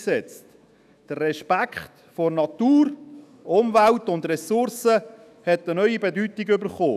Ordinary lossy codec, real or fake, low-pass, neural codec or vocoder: none; fake; 14.4 kHz; autoencoder, 48 kHz, 128 numbers a frame, DAC-VAE, trained on Japanese speech